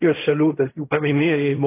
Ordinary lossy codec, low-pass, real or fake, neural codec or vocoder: AAC, 24 kbps; 3.6 kHz; fake; codec, 16 kHz in and 24 kHz out, 0.4 kbps, LongCat-Audio-Codec, fine tuned four codebook decoder